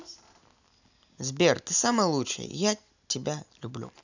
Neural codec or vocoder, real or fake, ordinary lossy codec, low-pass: none; real; none; 7.2 kHz